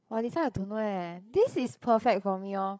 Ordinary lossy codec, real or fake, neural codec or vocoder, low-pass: none; fake; codec, 16 kHz, 8 kbps, FreqCodec, larger model; none